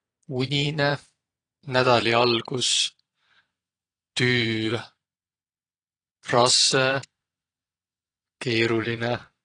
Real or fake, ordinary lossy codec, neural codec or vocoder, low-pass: fake; AAC, 32 kbps; vocoder, 22.05 kHz, 80 mel bands, Vocos; 9.9 kHz